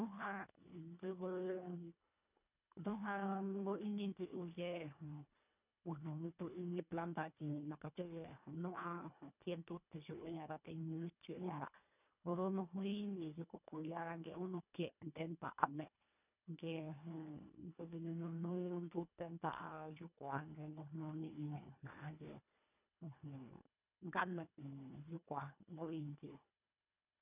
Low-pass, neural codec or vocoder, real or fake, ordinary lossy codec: 3.6 kHz; codec, 24 kHz, 1.5 kbps, HILCodec; fake; none